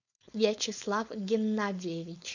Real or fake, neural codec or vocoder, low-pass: fake; codec, 16 kHz, 4.8 kbps, FACodec; 7.2 kHz